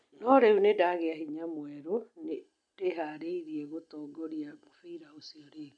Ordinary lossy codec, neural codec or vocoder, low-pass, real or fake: none; none; 9.9 kHz; real